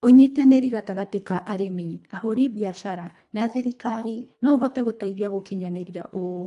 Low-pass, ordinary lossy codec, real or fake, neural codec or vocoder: 10.8 kHz; AAC, 96 kbps; fake; codec, 24 kHz, 1.5 kbps, HILCodec